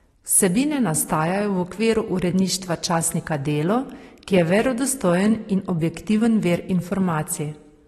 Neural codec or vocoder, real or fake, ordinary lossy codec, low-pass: none; real; AAC, 32 kbps; 19.8 kHz